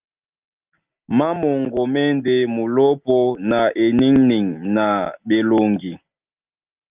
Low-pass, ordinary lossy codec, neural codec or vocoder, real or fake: 3.6 kHz; Opus, 24 kbps; none; real